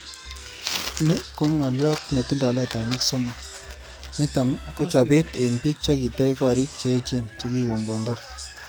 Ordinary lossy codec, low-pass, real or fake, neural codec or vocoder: none; none; fake; codec, 44.1 kHz, 2.6 kbps, SNAC